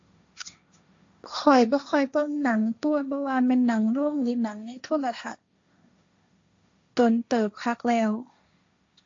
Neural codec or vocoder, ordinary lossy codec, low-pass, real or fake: codec, 16 kHz, 1.1 kbps, Voila-Tokenizer; AAC, 48 kbps; 7.2 kHz; fake